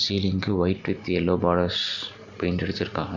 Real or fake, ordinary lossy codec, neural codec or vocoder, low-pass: real; none; none; 7.2 kHz